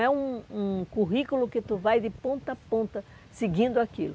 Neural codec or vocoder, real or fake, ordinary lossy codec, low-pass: none; real; none; none